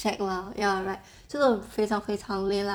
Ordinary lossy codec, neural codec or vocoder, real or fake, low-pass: none; vocoder, 44.1 kHz, 128 mel bands every 256 samples, BigVGAN v2; fake; none